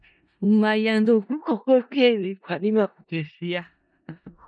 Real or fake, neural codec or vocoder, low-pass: fake; codec, 16 kHz in and 24 kHz out, 0.4 kbps, LongCat-Audio-Codec, four codebook decoder; 9.9 kHz